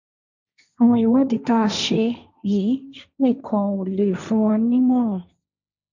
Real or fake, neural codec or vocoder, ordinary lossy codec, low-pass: fake; codec, 16 kHz, 1.1 kbps, Voila-Tokenizer; none; 7.2 kHz